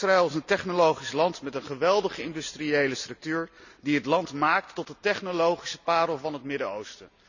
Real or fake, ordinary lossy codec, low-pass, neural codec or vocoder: real; none; 7.2 kHz; none